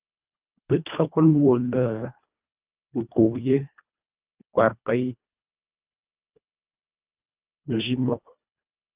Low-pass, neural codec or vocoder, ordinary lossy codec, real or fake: 3.6 kHz; codec, 24 kHz, 1.5 kbps, HILCodec; Opus, 32 kbps; fake